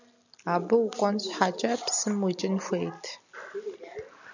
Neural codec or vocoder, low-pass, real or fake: none; 7.2 kHz; real